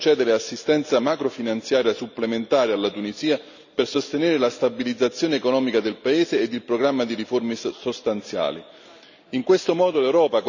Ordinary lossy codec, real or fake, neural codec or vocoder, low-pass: none; real; none; 7.2 kHz